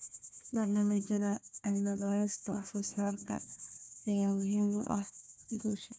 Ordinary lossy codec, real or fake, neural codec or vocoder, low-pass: none; fake; codec, 16 kHz, 1 kbps, FunCodec, trained on Chinese and English, 50 frames a second; none